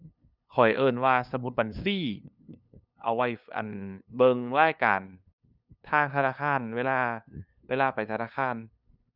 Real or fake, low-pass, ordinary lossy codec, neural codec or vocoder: fake; 5.4 kHz; none; codec, 16 kHz, 2 kbps, FunCodec, trained on LibriTTS, 25 frames a second